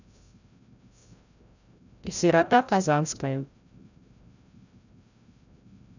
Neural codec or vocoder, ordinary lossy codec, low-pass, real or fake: codec, 16 kHz, 0.5 kbps, FreqCodec, larger model; none; 7.2 kHz; fake